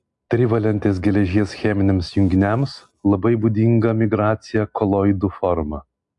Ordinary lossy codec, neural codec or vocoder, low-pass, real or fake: MP3, 64 kbps; none; 10.8 kHz; real